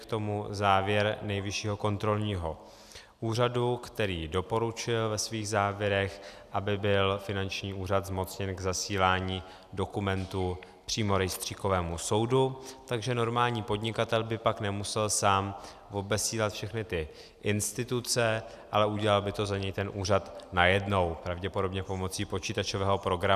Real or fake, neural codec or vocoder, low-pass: real; none; 14.4 kHz